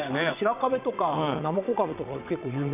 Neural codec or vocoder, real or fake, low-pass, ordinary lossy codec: vocoder, 22.05 kHz, 80 mel bands, WaveNeXt; fake; 3.6 kHz; none